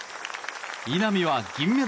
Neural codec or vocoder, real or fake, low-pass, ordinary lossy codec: none; real; none; none